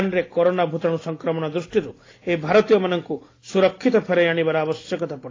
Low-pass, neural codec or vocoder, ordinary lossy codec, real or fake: 7.2 kHz; none; AAC, 32 kbps; real